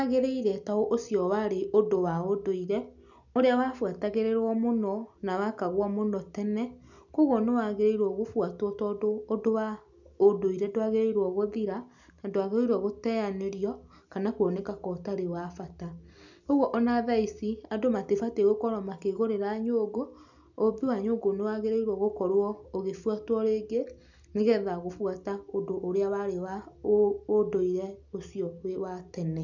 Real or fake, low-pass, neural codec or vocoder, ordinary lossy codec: real; 7.2 kHz; none; none